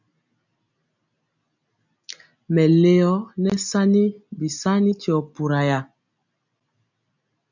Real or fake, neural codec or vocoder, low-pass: real; none; 7.2 kHz